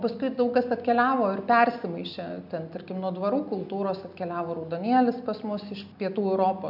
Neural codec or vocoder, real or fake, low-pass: none; real; 5.4 kHz